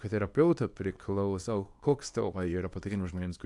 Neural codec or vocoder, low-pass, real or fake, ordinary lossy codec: codec, 24 kHz, 0.9 kbps, WavTokenizer, small release; 10.8 kHz; fake; AAC, 64 kbps